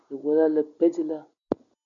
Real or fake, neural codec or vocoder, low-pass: real; none; 7.2 kHz